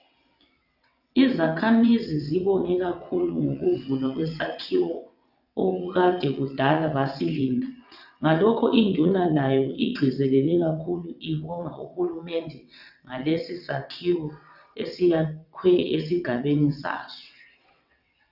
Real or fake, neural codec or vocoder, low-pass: fake; vocoder, 22.05 kHz, 80 mel bands, WaveNeXt; 5.4 kHz